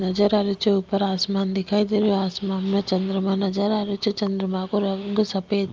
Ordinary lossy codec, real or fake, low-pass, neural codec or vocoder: Opus, 32 kbps; real; 7.2 kHz; none